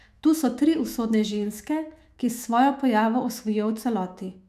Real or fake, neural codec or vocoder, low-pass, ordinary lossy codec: fake; autoencoder, 48 kHz, 128 numbers a frame, DAC-VAE, trained on Japanese speech; 14.4 kHz; none